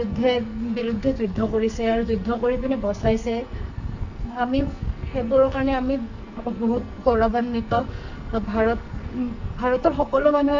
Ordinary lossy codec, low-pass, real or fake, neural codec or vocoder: Opus, 64 kbps; 7.2 kHz; fake; codec, 44.1 kHz, 2.6 kbps, SNAC